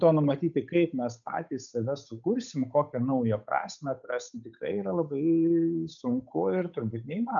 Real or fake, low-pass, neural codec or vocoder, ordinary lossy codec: fake; 7.2 kHz; codec, 16 kHz, 8 kbps, FunCodec, trained on Chinese and English, 25 frames a second; MP3, 96 kbps